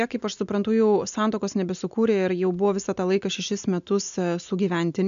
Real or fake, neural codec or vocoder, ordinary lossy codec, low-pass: real; none; MP3, 64 kbps; 7.2 kHz